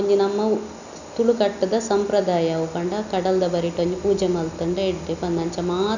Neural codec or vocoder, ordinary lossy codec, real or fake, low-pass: none; none; real; 7.2 kHz